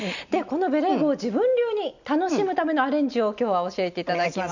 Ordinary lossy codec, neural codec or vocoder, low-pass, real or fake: none; none; 7.2 kHz; real